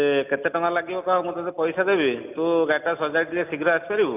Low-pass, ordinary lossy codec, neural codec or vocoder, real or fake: 3.6 kHz; none; none; real